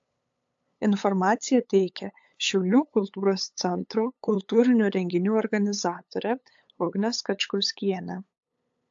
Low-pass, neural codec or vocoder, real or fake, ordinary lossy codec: 7.2 kHz; codec, 16 kHz, 8 kbps, FunCodec, trained on LibriTTS, 25 frames a second; fake; AAC, 64 kbps